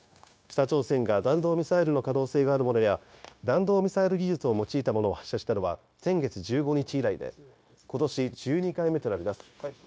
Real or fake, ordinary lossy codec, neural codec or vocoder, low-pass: fake; none; codec, 16 kHz, 0.9 kbps, LongCat-Audio-Codec; none